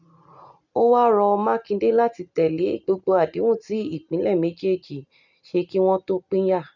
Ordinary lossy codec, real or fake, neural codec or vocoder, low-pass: none; real; none; 7.2 kHz